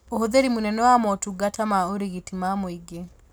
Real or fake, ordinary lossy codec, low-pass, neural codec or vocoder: real; none; none; none